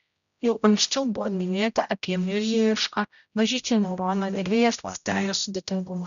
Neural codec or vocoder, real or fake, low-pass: codec, 16 kHz, 0.5 kbps, X-Codec, HuBERT features, trained on general audio; fake; 7.2 kHz